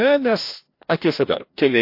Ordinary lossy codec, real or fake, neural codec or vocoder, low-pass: MP3, 32 kbps; fake; codec, 16 kHz, 1 kbps, FreqCodec, larger model; 5.4 kHz